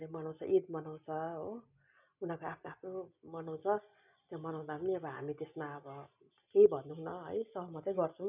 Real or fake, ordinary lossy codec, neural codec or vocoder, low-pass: real; none; none; 3.6 kHz